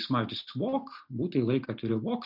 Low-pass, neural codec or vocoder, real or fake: 5.4 kHz; none; real